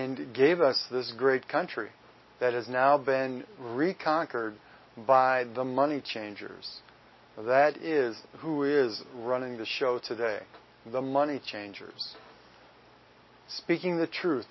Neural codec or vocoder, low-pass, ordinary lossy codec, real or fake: none; 7.2 kHz; MP3, 24 kbps; real